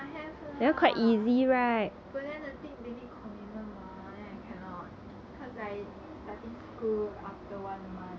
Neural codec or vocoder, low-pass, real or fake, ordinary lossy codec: none; none; real; none